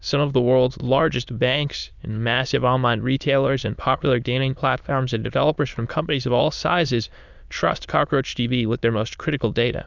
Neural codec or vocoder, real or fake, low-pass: autoencoder, 22.05 kHz, a latent of 192 numbers a frame, VITS, trained on many speakers; fake; 7.2 kHz